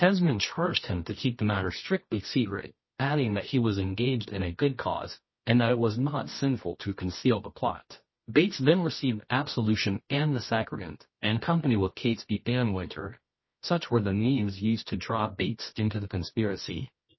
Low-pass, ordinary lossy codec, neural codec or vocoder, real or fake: 7.2 kHz; MP3, 24 kbps; codec, 24 kHz, 0.9 kbps, WavTokenizer, medium music audio release; fake